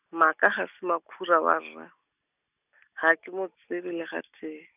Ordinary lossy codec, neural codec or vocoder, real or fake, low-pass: none; none; real; 3.6 kHz